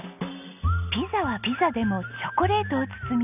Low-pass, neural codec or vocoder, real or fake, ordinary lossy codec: 3.6 kHz; none; real; none